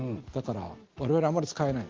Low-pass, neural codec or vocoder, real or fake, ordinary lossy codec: 7.2 kHz; none; real; Opus, 16 kbps